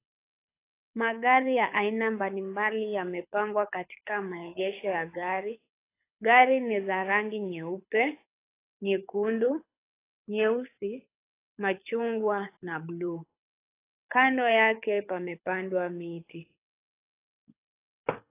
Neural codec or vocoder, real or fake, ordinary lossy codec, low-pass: codec, 24 kHz, 6 kbps, HILCodec; fake; AAC, 24 kbps; 3.6 kHz